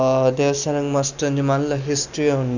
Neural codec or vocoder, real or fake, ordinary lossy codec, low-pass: none; real; none; 7.2 kHz